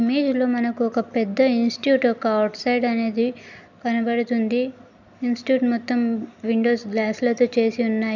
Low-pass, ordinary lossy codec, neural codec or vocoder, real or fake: 7.2 kHz; none; none; real